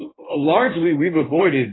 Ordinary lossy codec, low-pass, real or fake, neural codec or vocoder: AAC, 16 kbps; 7.2 kHz; fake; codec, 16 kHz in and 24 kHz out, 1.1 kbps, FireRedTTS-2 codec